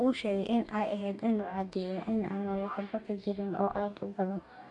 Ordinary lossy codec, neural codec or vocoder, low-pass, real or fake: none; codec, 44.1 kHz, 2.6 kbps, DAC; 10.8 kHz; fake